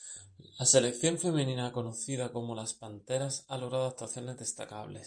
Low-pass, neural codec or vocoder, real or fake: 9.9 kHz; vocoder, 44.1 kHz, 128 mel bands every 512 samples, BigVGAN v2; fake